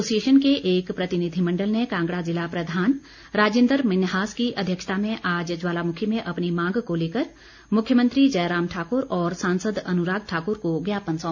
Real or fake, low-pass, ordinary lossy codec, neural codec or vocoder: real; 7.2 kHz; none; none